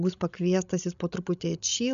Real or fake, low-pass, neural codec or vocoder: fake; 7.2 kHz; codec, 16 kHz, 16 kbps, FreqCodec, larger model